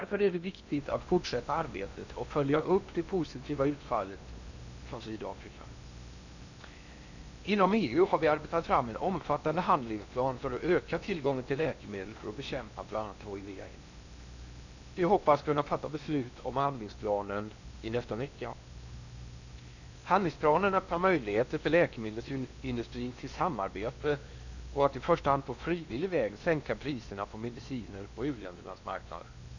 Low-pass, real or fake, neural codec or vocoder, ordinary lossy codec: 7.2 kHz; fake; codec, 16 kHz in and 24 kHz out, 0.8 kbps, FocalCodec, streaming, 65536 codes; none